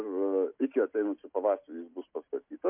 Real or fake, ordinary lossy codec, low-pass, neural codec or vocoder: real; MP3, 32 kbps; 3.6 kHz; none